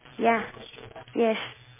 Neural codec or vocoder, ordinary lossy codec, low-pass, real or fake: none; MP3, 16 kbps; 3.6 kHz; real